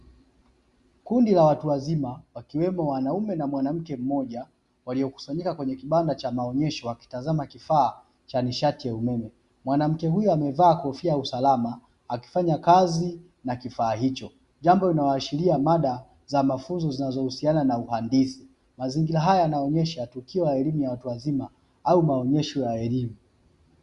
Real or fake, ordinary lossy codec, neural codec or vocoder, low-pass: real; Opus, 64 kbps; none; 10.8 kHz